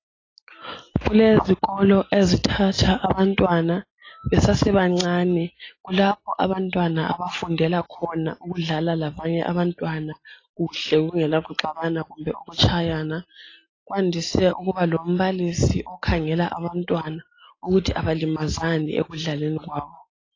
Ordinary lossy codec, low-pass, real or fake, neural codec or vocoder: AAC, 32 kbps; 7.2 kHz; real; none